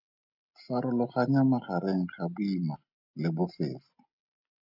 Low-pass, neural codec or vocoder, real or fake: 5.4 kHz; none; real